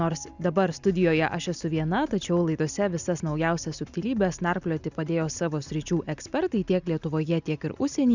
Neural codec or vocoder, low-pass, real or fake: none; 7.2 kHz; real